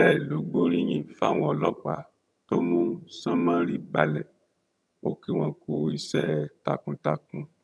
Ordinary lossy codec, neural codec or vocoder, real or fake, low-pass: none; vocoder, 22.05 kHz, 80 mel bands, HiFi-GAN; fake; none